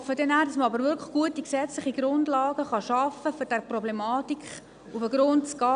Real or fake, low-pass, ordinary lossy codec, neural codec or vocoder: real; 9.9 kHz; none; none